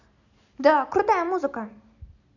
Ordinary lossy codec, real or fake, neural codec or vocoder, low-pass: none; real; none; 7.2 kHz